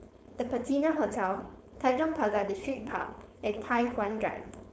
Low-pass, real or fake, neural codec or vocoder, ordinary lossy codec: none; fake; codec, 16 kHz, 4.8 kbps, FACodec; none